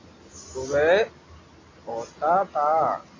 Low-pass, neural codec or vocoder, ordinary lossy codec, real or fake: 7.2 kHz; none; AAC, 32 kbps; real